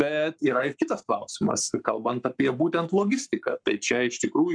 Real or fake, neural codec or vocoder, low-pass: fake; codec, 44.1 kHz, 7.8 kbps, Pupu-Codec; 9.9 kHz